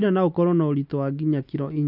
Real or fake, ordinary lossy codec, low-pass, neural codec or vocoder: real; none; 5.4 kHz; none